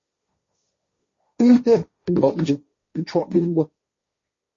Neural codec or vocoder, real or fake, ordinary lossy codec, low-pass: codec, 16 kHz, 1.1 kbps, Voila-Tokenizer; fake; MP3, 32 kbps; 7.2 kHz